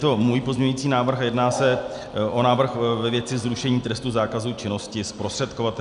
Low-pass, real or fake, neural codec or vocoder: 10.8 kHz; real; none